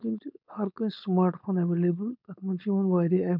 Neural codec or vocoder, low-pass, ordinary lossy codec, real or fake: codec, 16 kHz, 4.8 kbps, FACodec; 5.4 kHz; none; fake